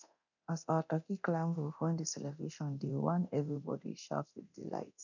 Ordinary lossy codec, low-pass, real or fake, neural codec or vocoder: none; 7.2 kHz; fake; codec, 24 kHz, 0.9 kbps, DualCodec